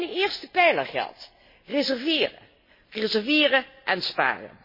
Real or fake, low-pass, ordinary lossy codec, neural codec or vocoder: real; 5.4 kHz; MP3, 24 kbps; none